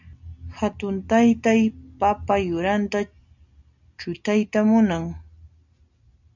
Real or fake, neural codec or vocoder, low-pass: real; none; 7.2 kHz